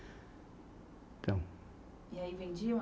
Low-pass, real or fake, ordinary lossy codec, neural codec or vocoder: none; real; none; none